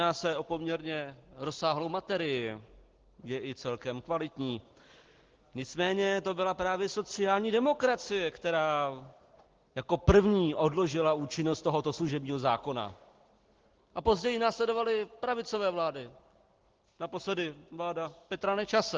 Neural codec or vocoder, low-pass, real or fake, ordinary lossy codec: none; 7.2 kHz; real; Opus, 16 kbps